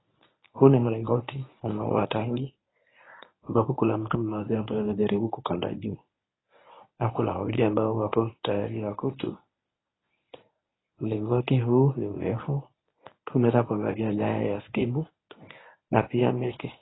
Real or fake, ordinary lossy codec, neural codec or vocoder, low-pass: fake; AAC, 16 kbps; codec, 24 kHz, 0.9 kbps, WavTokenizer, medium speech release version 1; 7.2 kHz